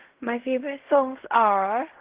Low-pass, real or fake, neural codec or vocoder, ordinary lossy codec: 3.6 kHz; fake; codec, 16 kHz in and 24 kHz out, 0.4 kbps, LongCat-Audio-Codec, fine tuned four codebook decoder; Opus, 16 kbps